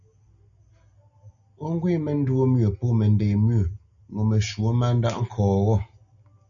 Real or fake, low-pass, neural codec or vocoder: real; 7.2 kHz; none